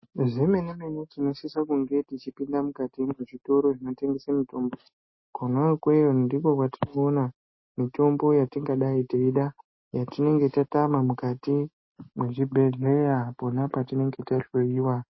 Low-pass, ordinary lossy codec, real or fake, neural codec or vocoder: 7.2 kHz; MP3, 24 kbps; real; none